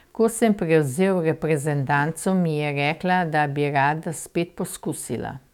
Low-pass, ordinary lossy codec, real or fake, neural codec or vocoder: 19.8 kHz; none; fake; autoencoder, 48 kHz, 128 numbers a frame, DAC-VAE, trained on Japanese speech